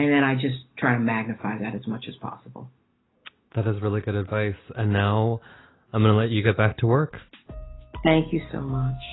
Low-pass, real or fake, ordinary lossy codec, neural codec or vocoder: 7.2 kHz; real; AAC, 16 kbps; none